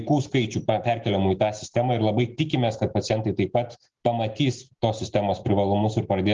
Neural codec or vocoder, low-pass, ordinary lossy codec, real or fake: none; 7.2 kHz; Opus, 24 kbps; real